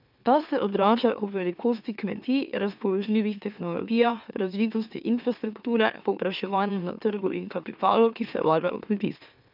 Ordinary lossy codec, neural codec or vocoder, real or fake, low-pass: none; autoencoder, 44.1 kHz, a latent of 192 numbers a frame, MeloTTS; fake; 5.4 kHz